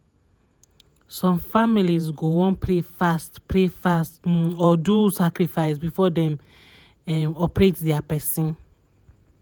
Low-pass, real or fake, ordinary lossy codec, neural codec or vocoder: none; fake; none; vocoder, 48 kHz, 128 mel bands, Vocos